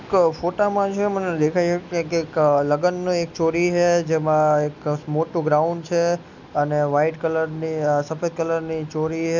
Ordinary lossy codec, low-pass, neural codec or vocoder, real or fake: none; 7.2 kHz; none; real